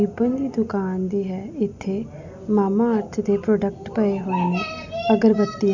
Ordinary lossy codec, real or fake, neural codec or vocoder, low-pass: none; real; none; 7.2 kHz